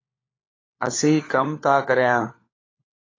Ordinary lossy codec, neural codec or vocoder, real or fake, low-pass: AAC, 32 kbps; codec, 16 kHz, 4 kbps, FunCodec, trained on LibriTTS, 50 frames a second; fake; 7.2 kHz